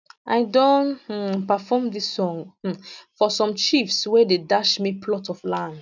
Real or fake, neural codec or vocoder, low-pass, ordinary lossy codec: real; none; 7.2 kHz; none